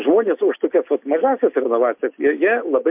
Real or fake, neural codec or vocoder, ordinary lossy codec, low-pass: real; none; MP3, 32 kbps; 3.6 kHz